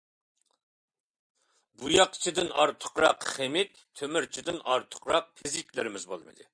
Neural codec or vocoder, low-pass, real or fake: vocoder, 44.1 kHz, 128 mel bands every 256 samples, BigVGAN v2; 9.9 kHz; fake